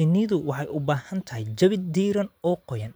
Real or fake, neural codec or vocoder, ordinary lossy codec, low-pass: fake; vocoder, 44.1 kHz, 128 mel bands every 512 samples, BigVGAN v2; none; none